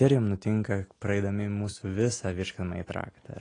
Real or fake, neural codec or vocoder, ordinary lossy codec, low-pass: real; none; AAC, 32 kbps; 9.9 kHz